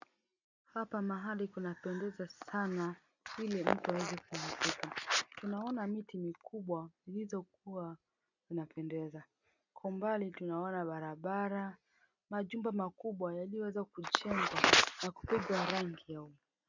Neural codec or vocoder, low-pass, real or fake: none; 7.2 kHz; real